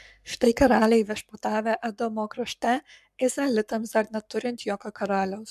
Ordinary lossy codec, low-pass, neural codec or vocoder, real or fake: MP3, 96 kbps; 14.4 kHz; codec, 44.1 kHz, 7.8 kbps, Pupu-Codec; fake